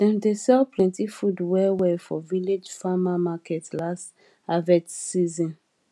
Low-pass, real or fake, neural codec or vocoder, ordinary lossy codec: none; real; none; none